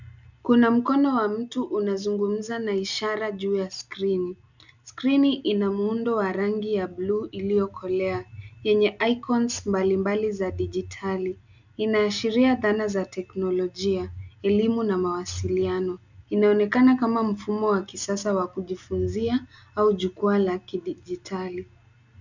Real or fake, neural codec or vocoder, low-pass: real; none; 7.2 kHz